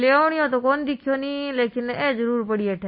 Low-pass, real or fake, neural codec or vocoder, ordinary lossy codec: 7.2 kHz; real; none; MP3, 24 kbps